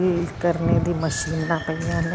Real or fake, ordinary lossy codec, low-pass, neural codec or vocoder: real; none; none; none